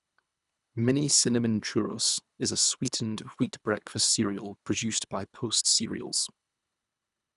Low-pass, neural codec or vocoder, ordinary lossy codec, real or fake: 10.8 kHz; codec, 24 kHz, 3 kbps, HILCodec; none; fake